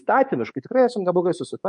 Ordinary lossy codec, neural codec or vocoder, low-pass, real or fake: MP3, 48 kbps; codec, 24 kHz, 3.1 kbps, DualCodec; 10.8 kHz; fake